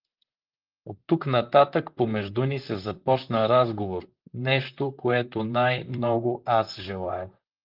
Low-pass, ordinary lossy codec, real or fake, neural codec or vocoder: 5.4 kHz; Opus, 24 kbps; fake; vocoder, 44.1 kHz, 128 mel bands, Pupu-Vocoder